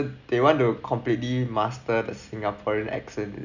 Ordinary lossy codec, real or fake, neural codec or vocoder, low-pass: none; real; none; 7.2 kHz